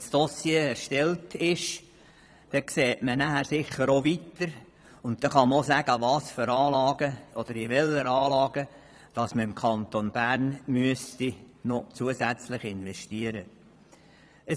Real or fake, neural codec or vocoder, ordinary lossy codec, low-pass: fake; vocoder, 22.05 kHz, 80 mel bands, Vocos; none; none